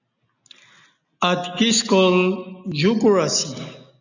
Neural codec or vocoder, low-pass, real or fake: none; 7.2 kHz; real